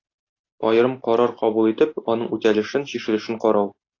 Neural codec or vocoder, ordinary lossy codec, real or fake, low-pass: none; AAC, 32 kbps; real; 7.2 kHz